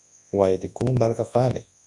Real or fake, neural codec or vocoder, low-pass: fake; codec, 24 kHz, 0.9 kbps, WavTokenizer, large speech release; 10.8 kHz